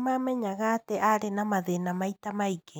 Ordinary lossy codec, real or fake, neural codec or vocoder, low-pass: none; real; none; none